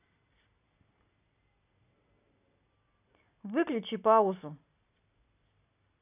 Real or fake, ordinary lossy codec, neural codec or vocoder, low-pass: real; none; none; 3.6 kHz